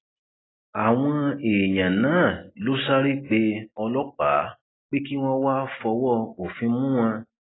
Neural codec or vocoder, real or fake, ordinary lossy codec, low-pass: none; real; AAC, 16 kbps; 7.2 kHz